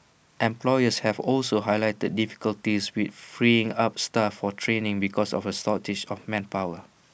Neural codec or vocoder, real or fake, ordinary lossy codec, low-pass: none; real; none; none